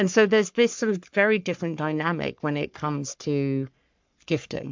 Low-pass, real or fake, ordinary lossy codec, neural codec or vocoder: 7.2 kHz; fake; MP3, 64 kbps; codec, 44.1 kHz, 3.4 kbps, Pupu-Codec